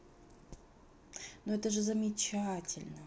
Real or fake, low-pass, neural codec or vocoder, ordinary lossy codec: real; none; none; none